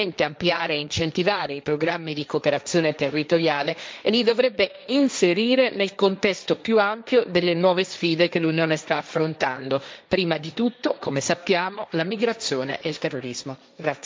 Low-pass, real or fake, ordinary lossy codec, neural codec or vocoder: none; fake; none; codec, 16 kHz, 1.1 kbps, Voila-Tokenizer